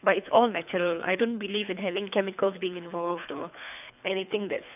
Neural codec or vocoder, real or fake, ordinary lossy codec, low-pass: codec, 24 kHz, 3 kbps, HILCodec; fake; none; 3.6 kHz